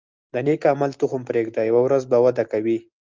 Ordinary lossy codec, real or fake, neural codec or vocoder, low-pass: Opus, 24 kbps; real; none; 7.2 kHz